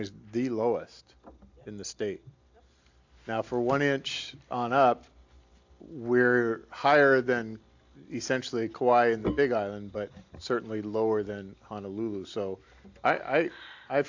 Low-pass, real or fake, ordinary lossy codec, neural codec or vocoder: 7.2 kHz; real; AAC, 48 kbps; none